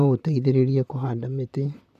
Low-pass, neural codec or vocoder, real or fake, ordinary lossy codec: 14.4 kHz; vocoder, 44.1 kHz, 128 mel bands, Pupu-Vocoder; fake; none